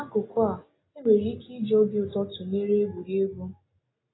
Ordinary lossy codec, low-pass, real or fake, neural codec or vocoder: AAC, 16 kbps; 7.2 kHz; real; none